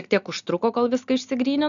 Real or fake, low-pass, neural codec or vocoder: real; 7.2 kHz; none